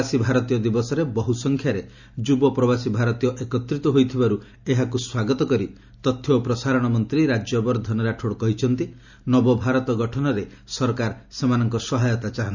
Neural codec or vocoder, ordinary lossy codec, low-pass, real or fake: none; none; 7.2 kHz; real